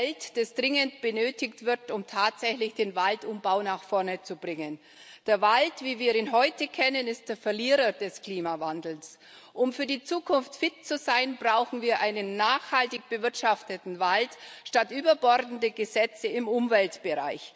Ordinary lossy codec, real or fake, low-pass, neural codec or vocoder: none; real; none; none